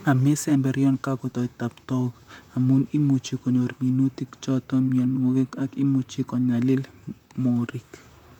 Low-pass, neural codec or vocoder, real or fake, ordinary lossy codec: 19.8 kHz; vocoder, 44.1 kHz, 128 mel bands, Pupu-Vocoder; fake; none